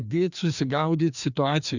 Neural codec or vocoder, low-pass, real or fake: codec, 16 kHz, 2 kbps, FreqCodec, larger model; 7.2 kHz; fake